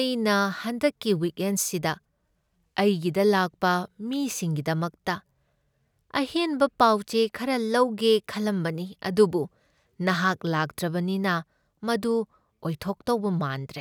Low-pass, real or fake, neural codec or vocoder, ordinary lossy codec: none; real; none; none